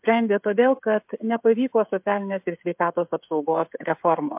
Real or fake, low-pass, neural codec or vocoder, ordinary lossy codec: fake; 3.6 kHz; codec, 16 kHz, 16 kbps, FreqCodec, smaller model; MP3, 32 kbps